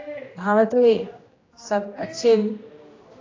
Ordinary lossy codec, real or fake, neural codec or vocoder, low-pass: AAC, 32 kbps; fake; codec, 16 kHz, 1 kbps, X-Codec, HuBERT features, trained on general audio; 7.2 kHz